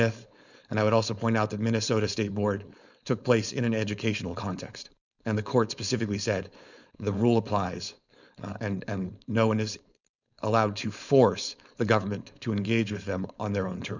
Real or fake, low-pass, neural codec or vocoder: fake; 7.2 kHz; codec, 16 kHz, 4.8 kbps, FACodec